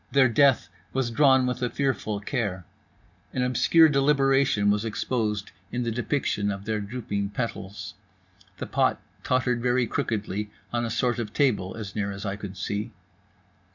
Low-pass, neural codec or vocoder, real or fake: 7.2 kHz; none; real